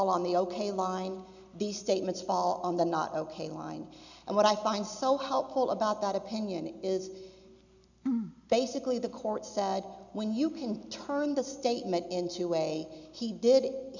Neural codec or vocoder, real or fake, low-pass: none; real; 7.2 kHz